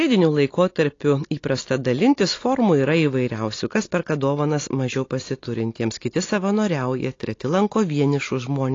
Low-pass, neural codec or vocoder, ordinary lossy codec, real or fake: 7.2 kHz; none; AAC, 32 kbps; real